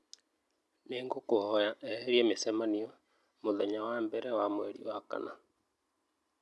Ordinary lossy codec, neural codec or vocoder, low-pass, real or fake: none; none; none; real